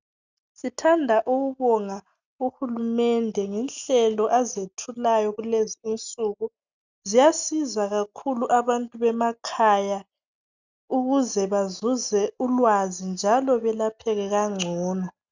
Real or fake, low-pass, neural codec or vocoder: real; 7.2 kHz; none